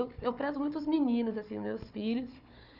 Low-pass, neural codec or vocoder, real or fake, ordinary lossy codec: 5.4 kHz; codec, 16 kHz, 4 kbps, FunCodec, trained on Chinese and English, 50 frames a second; fake; none